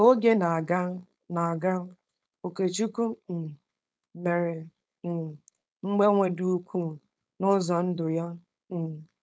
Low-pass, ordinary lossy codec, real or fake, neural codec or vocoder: none; none; fake; codec, 16 kHz, 4.8 kbps, FACodec